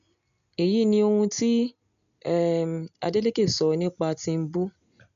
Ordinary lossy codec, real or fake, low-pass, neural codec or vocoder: MP3, 96 kbps; real; 7.2 kHz; none